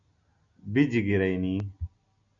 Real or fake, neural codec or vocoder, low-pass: real; none; 7.2 kHz